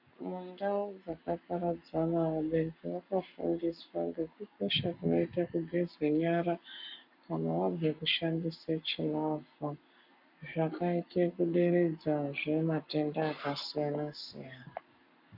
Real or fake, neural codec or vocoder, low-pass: fake; codec, 44.1 kHz, 7.8 kbps, Pupu-Codec; 5.4 kHz